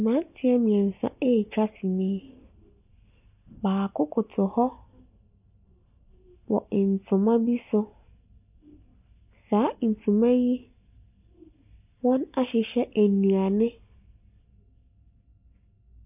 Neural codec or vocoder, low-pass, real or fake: none; 3.6 kHz; real